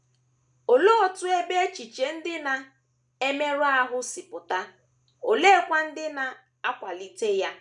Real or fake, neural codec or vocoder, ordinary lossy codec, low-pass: real; none; none; 10.8 kHz